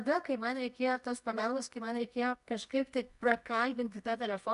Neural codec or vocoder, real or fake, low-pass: codec, 24 kHz, 0.9 kbps, WavTokenizer, medium music audio release; fake; 10.8 kHz